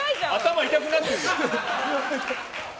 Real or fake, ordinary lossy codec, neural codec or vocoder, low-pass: real; none; none; none